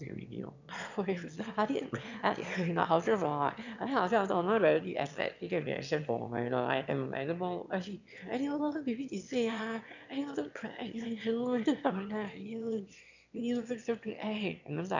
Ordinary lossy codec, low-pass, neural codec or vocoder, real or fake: none; 7.2 kHz; autoencoder, 22.05 kHz, a latent of 192 numbers a frame, VITS, trained on one speaker; fake